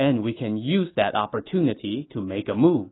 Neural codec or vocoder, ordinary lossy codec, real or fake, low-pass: autoencoder, 48 kHz, 128 numbers a frame, DAC-VAE, trained on Japanese speech; AAC, 16 kbps; fake; 7.2 kHz